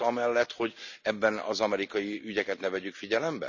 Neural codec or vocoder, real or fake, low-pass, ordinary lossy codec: none; real; 7.2 kHz; none